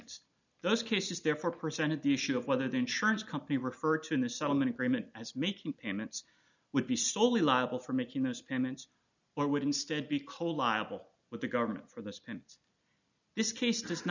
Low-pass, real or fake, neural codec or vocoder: 7.2 kHz; real; none